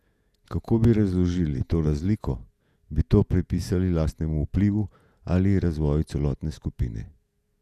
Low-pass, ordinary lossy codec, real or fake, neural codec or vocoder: 14.4 kHz; none; fake; vocoder, 48 kHz, 128 mel bands, Vocos